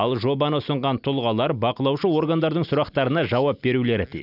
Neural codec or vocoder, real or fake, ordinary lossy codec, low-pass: none; real; none; 5.4 kHz